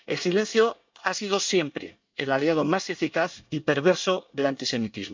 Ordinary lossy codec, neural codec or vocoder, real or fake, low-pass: MP3, 64 kbps; codec, 24 kHz, 1 kbps, SNAC; fake; 7.2 kHz